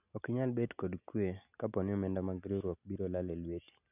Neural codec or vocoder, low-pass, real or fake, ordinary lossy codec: none; 3.6 kHz; real; none